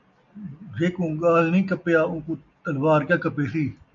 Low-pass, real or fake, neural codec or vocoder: 7.2 kHz; real; none